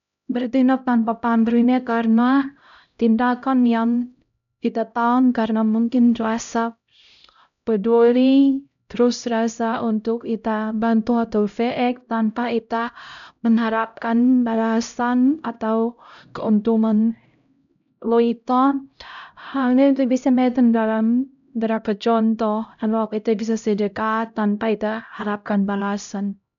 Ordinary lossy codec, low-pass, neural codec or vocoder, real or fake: none; 7.2 kHz; codec, 16 kHz, 0.5 kbps, X-Codec, HuBERT features, trained on LibriSpeech; fake